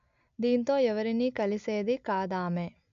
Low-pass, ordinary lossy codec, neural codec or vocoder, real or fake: 7.2 kHz; MP3, 64 kbps; none; real